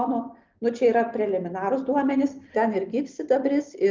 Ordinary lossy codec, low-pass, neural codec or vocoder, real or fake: Opus, 32 kbps; 7.2 kHz; none; real